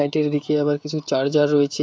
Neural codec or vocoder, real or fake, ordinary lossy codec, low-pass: codec, 16 kHz, 16 kbps, FreqCodec, smaller model; fake; none; none